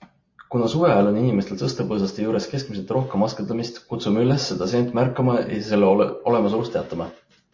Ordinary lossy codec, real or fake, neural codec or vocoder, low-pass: MP3, 32 kbps; real; none; 7.2 kHz